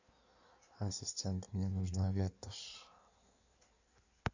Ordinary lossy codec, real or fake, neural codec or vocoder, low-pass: AAC, 48 kbps; fake; codec, 16 kHz in and 24 kHz out, 1.1 kbps, FireRedTTS-2 codec; 7.2 kHz